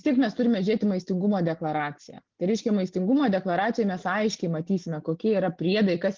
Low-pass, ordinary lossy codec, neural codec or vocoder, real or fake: 7.2 kHz; Opus, 32 kbps; none; real